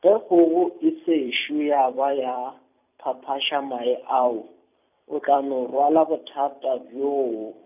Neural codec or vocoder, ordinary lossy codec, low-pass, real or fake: vocoder, 44.1 kHz, 128 mel bands every 256 samples, BigVGAN v2; none; 3.6 kHz; fake